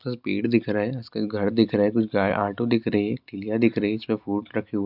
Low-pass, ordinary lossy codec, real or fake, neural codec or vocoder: 5.4 kHz; none; real; none